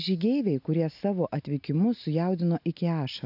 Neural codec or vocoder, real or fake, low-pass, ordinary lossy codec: none; real; 5.4 kHz; AAC, 48 kbps